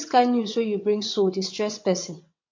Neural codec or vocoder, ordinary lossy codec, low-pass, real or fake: vocoder, 22.05 kHz, 80 mel bands, WaveNeXt; MP3, 48 kbps; 7.2 kHz; fake